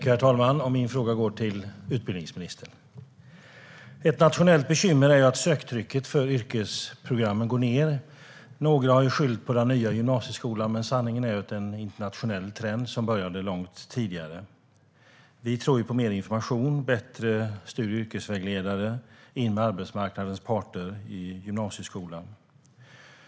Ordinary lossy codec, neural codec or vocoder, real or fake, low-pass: none; none; real; none